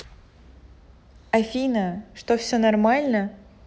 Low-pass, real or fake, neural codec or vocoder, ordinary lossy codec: none; real; none; none